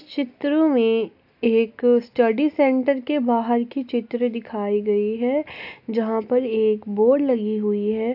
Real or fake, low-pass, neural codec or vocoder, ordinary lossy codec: real; 5.4 kHz; none; AAC, 48 kbps